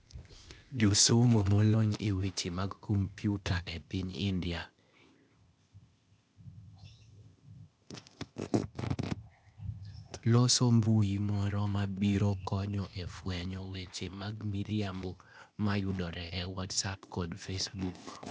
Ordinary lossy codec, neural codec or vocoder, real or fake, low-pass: none; codec, 16 kHz, 0.8 kbps, ZipCodec; fake; none